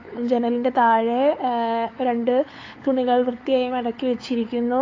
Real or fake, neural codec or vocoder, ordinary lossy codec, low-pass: fake; codec, 16 kHz, 8 kbps, FunCodec, trained on LibriTTS, 25 frames a second; AAC, 32 kbps; 7.2 kHz